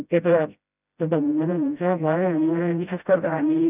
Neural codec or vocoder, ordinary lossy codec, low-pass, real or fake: codec, 16 kHz, 0.5 kbps, FreqCodec, smaller model; none; 3.6 kHz; fake